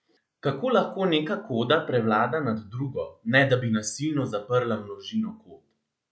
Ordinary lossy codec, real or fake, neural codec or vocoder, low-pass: none; real; none; none